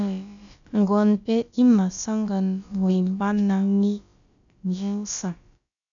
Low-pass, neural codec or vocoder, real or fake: 7.2 kHz; codec, 16 kHz, about 1 kbps, DyCAST, with the encoder's durations; fake